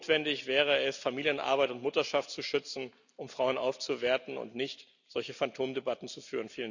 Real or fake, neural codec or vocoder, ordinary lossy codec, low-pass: real; none; none; 7.2 kHz